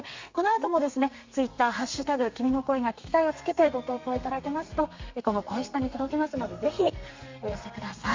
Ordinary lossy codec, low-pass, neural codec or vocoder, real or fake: MP3, 48 kbps; 7.2 kHz; codec, 32 kHz, 1.9 kbps, SNAC; fake